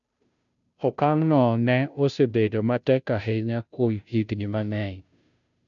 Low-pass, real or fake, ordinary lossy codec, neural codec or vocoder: 7.2 kHz; fake; none; codec, 16 kHz, 0.5 kbps, FunCodec, trained on Chinese and English, 25 frames a second